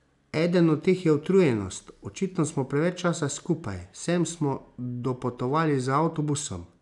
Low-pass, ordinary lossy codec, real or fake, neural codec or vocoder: 10.8 kHz; none; real; none